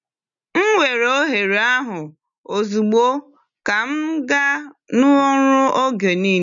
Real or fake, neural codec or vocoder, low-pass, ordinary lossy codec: real; none; 7.2 kHz; none